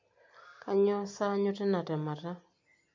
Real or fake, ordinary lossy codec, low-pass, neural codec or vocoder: real; MP3, 48 kbps; 7.2 kHz; none